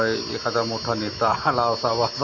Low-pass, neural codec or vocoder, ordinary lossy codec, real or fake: 7.2 kHz; none; none; real